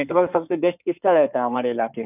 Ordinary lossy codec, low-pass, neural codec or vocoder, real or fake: none; 3.6 kHz; codec, 16 kHz in and 24 kHz out, 2.2 kbps, FireRedTTS-2 codec; fake